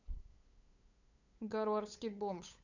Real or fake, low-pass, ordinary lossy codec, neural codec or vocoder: fake; 7.2 kHz; none; codec, 16 kHz, 8 kbps, FunCodec, trained on LibriTTS, 25 frames a second